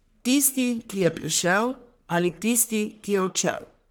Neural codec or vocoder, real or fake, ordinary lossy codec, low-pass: codec, 44.1 kHz, 1.7 kbps, Pupu-Codec; fake; none; none